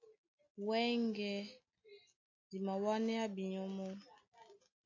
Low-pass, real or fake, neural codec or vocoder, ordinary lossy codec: 7.2 kHz; real; none; AAC, 48 kbps